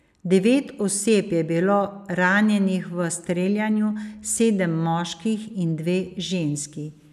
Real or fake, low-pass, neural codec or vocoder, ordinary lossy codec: real; 14.4 kHz; none; none